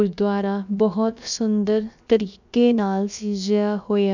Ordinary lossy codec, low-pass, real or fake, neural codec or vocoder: none; 7.2 kHz; fake; codec, 16 kHz, about 1 kbps, DyCAST, with the encoder's durations